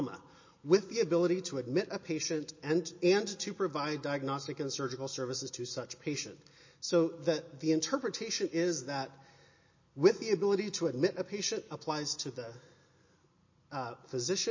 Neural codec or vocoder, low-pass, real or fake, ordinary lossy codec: none; 7.2 kHz; real; MP3, 32 kbps